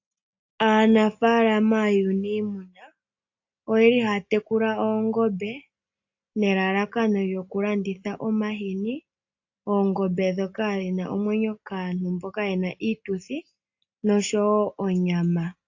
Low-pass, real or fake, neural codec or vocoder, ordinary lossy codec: 7.2 kHz; real; none; AAC, 48 kbps